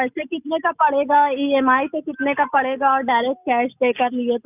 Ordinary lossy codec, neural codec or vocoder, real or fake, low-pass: none; none; real; 3.6 kHz